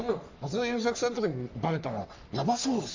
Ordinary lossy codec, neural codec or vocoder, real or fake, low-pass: MP3, 64 kbps; codec, 44.1 kHz, 3.4 kbps, Pupu-Codec; fake; 7.2 kHz